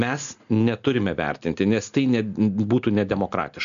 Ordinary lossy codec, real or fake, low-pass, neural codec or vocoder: MP3, 96 kbps; real; 7.2 kHz; none